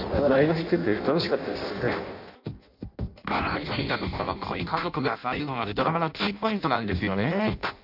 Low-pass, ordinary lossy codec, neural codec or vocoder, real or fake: 5.4 kHz; none; codec, 16 kHz in and 24 kHz out, 0.6 kbps, FireRedTTS-2 codec; fake